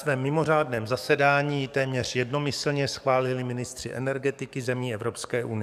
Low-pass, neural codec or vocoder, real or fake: 14.4 kHz; codec, 44.1 kHz, 7.8 kbps, DAC; fake